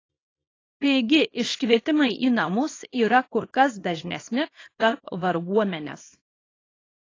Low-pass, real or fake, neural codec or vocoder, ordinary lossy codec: 7.2 kHz; fake; codec, 24 kHz, 0.9 kbps, WavTokenizer, small release; AAC, 32 kbps